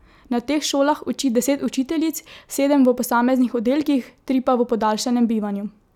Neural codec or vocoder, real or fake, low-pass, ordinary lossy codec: none; real; 19.8 kHz; none